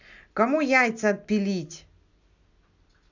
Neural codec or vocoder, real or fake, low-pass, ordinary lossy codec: none; real; 7.2 kHz; none